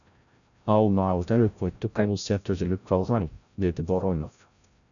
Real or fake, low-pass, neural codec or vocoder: fake; 7.2 kHz; codec, 16 kHz, 0.5 kbps, FreqCodec, larger model